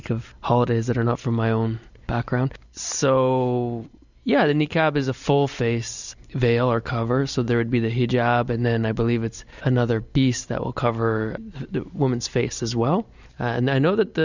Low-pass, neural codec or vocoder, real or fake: 7.2 kHz; none; real